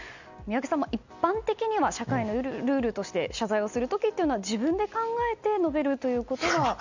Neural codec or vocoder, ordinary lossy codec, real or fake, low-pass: none; none; real; 7.2 kHz